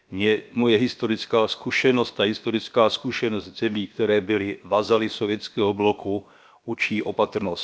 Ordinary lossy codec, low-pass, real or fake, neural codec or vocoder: none; none; fake; codec, 16 kHz, about 1 kbps, DyCAST, with the encoder's durations